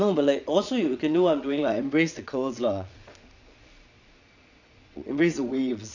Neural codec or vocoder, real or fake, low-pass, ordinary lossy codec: vocoder, 22.05 kHz, 80 mel bands, Vocos; fake; 7.2 kHz; none